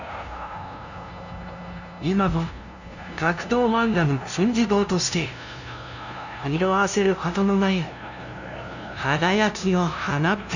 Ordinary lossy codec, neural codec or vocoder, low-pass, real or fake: none; codec, 16 kHz, 0.5 kbps, FunCodec, trained on LibriTTS, 25 frames a second; 7.2 kHz; fake